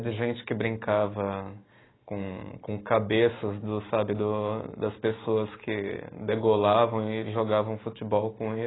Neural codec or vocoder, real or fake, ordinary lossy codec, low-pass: none; real; AAC, 16 kbps; 7.2 kHz